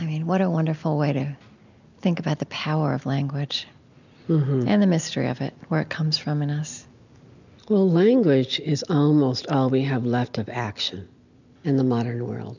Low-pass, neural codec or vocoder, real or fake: 7.2 kHz; none; real